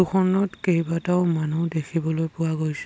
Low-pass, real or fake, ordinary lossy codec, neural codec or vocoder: none; real; none; none